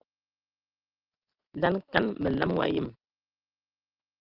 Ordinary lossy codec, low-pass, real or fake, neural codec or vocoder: Opus, 32 kbps; 5.4 kHz; real; none